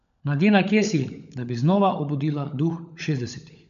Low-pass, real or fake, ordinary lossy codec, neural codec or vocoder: 7.2 kHz; fake; none; codec, 16 kHz, 16 kbps, FunCodec, trained on LibriTTS, 50 frames a second